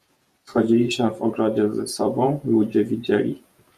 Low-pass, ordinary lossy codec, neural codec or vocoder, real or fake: 14.4 kHz; Opus, 64 kbps; vocoder, 48 kHz, 128 mel bands, Vocos; fake